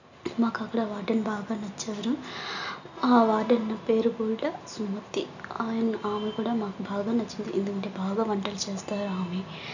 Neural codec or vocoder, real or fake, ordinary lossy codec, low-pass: none; real; none; 7.2 kHz